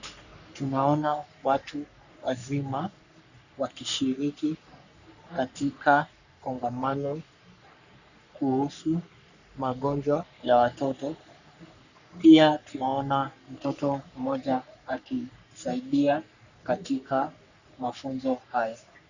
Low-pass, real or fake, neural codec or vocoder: 7.2 kHz; fake; codec, 44.1 kHz, 3.4 kbps, Pupu-Codec